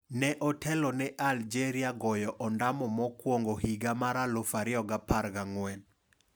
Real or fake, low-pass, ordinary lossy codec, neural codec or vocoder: real; none; none; none